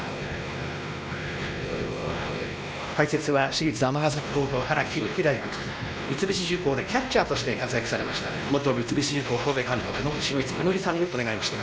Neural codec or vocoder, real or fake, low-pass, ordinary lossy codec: codec, 16 kHz, 1 kbps, X-Codec, WavLM features, trained on Multilingual LibriSpeech; fake; none; none